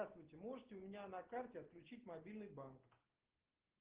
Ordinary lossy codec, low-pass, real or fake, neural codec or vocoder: Opus, 16 kbps; 3.6 kHz; real; none